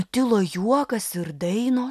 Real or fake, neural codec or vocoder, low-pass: real; none; 14.4 kHz